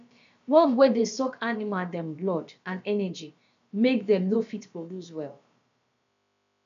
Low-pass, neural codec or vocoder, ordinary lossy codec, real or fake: 7.2 kHz; codec, 16 kHz, about 1 kbps, DyCAST, with the encoder's durations; MP3, 64 kbps; fake